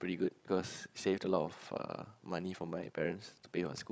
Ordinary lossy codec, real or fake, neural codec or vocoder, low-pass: none; fake; codec, 16 kHz, 16 kbps, FreqCodec, larger model; none